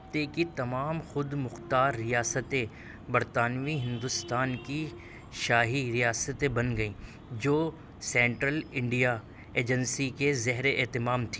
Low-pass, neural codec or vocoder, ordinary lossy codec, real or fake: none; none; none; real